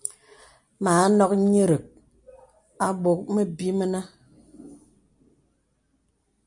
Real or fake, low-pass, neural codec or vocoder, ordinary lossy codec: real; 10.8 kHz; none; MP3, 64 kbps